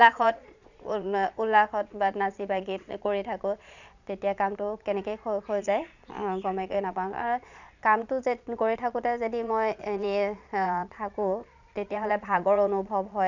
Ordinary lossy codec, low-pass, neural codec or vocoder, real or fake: none; 7.2 kHz; vocoder, 22.05 kHz, 80 mel bands, Vocos; fake